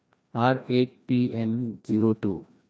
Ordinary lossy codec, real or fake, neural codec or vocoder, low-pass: none; fake; codec, 16 kHz, 1 kbps, FreqCodec, larger model; none